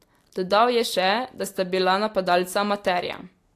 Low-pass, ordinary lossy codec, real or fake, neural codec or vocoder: 14.4 kHz; AAC, 64 kbps; real; none